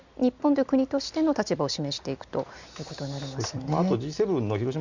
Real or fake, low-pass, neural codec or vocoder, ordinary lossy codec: real; 7.2 kHz; none; Opus, 64 kbps